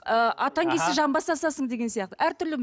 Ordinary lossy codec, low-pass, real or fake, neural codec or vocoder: none; none; real; none